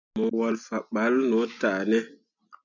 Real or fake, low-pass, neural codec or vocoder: real; 7.2 kHz; none